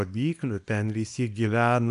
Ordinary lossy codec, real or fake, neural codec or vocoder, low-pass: AAC, 96 kbps; fake; codec, 24 kHz, 0.9 kbps, WavTokenizer, small release; 10.8 kHz